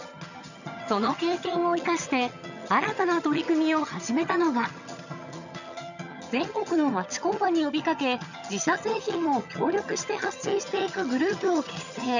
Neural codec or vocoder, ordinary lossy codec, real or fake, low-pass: vocoder, 22.05 kHz, 80 mel bands, HiFi-GAN; none; fake; 7.2 kHz